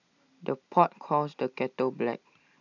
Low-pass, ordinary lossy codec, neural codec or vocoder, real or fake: 7.2 kHz; none; none; real